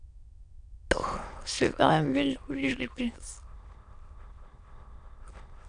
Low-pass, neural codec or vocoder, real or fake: 9.9 kHz; autoencoder, 22.05 kHz, a latent of 192 numbers a frame, VITS, trained on many speakers; fake